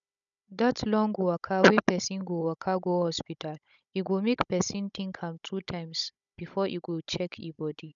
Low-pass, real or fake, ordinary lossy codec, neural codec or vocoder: 7.2 kHz; fake; none; codec, 16 kHz, 16 kbps, FunCodec, trained on Chinese and English, 50 frames a second